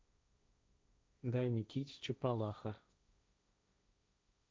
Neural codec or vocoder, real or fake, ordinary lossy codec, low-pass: codec, 16 kHz, 1.1 kbps, Voila-Tokenizer; fake; none; none